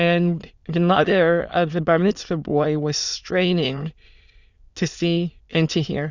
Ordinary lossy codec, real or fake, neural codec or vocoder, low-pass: Opus, 64 kbps; fake; autoencoder, 22.05 kHz, a latent of 192 numbers a frame, VITS, trained on many speakers; 7.2 kHz